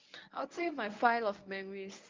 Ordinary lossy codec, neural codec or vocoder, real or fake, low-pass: Opus, 24 kbps; codec, 24 kHz, 0.9 kbps, WavTokenizer, medium speech release version 1; fake; 7.2 kHz